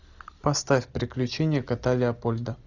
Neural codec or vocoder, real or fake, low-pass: none; real; 7.2 kHz